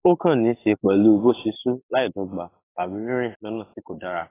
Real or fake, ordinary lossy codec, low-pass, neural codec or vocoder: fake; AAC, 16 kbps; 3.6 kHz; codec, 44.1 kHz, 7.8 kbps, DAC